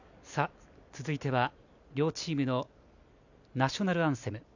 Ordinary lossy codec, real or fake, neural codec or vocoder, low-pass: none; real; none; 7.2 kHz